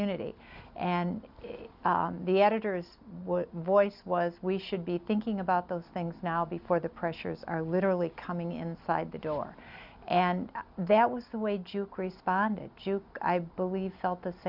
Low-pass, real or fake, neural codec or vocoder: 5.4 kHz; real; none